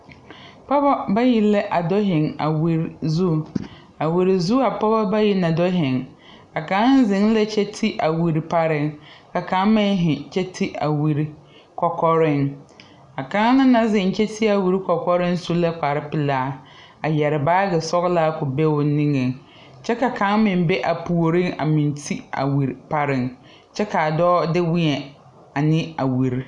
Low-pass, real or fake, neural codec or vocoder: 10.8 kHz; real; none